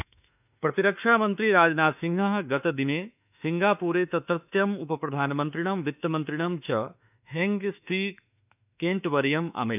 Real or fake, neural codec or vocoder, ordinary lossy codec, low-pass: fake; autoencoder, 48 kHz, 32 numbers a frame, DAC-VAE, trained on Japanese speech; none; 3.6 kHz